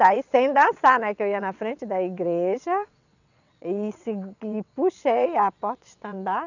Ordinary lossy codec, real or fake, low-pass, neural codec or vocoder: none; fake; 7.2 kHz; vocoder, 22.05 kHz, 80 mel bands, WaveNeXt